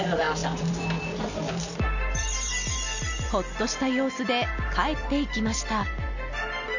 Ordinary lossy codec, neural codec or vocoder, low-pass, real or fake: none; none; 7.2 kHz; real